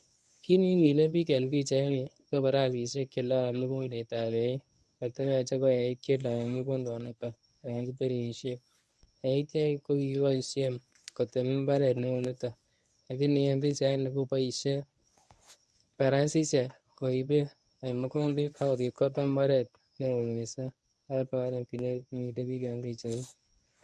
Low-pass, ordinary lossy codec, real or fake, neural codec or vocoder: none; none; fake; codec, 24 kHz, 0.9 kbps, WavTokenizer, medium speech release version 1